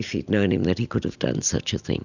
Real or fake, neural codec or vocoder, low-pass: real; none; 7.2 kHz